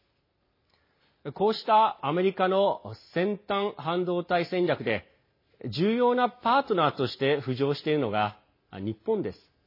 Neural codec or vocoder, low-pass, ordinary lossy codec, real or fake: none; 5.4 kHz; MP3, 24 kbps; real